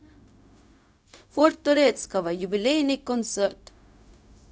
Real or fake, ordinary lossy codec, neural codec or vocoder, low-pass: fake; none; codec, 16 kHz, 0.4 kbps, LongCat-Audio-Codec; none